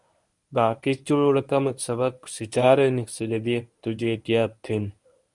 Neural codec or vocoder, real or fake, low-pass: codec, 24 kHz, 0.9 kbps, WavTokenizer, medium speech release version 1; fake; 10.8 kHz